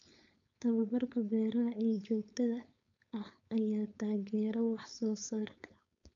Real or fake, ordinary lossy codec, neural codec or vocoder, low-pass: fake; none; codec, 16 kHz, 4.8 kbps, FACodec; 7.2 kHz